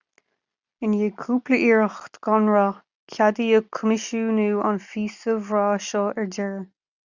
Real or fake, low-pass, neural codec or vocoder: real; 7.2 kHz; none